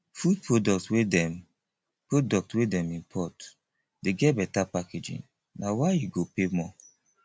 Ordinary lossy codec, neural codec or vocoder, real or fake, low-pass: none; none; real; none